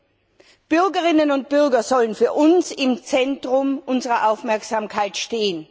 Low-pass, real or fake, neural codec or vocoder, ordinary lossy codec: none; real; none; none